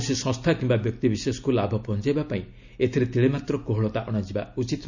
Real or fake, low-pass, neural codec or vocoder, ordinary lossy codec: real; 7.2 kHz; none; none